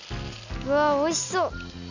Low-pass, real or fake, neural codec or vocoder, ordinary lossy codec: 7.2 kHz; real; none; none